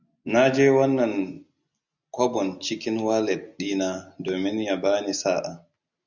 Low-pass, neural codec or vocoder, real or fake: 7.2 kHz; none; real